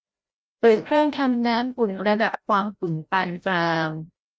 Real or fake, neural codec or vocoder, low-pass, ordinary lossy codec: fake; codec, 16 kHz, 0.5 kbps, FreqCodec, larger model; none; none